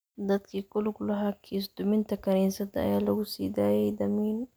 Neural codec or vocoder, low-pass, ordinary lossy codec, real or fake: none; none; none; real